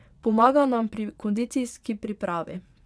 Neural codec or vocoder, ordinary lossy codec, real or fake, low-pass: vocoder, 22.05 kHz, 80 mel bands, WaveNeXt; none; fake; none